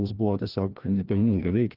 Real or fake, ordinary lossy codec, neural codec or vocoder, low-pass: fake; Opus, 32 kbps; codec, 16 kHz, 1 kbps, FreqCodec, larger model; 5.4 kHz